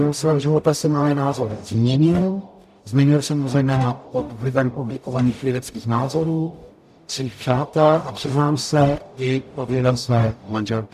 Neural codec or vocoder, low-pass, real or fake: codec, 44.1 kHz, 0.9 kbps, DAC; 14.4 kHz; fake